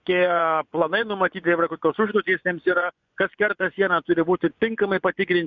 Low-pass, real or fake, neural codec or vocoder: 7.2 kHz; fake; vocoder, 24 kHz, 100 mel bands, Vocos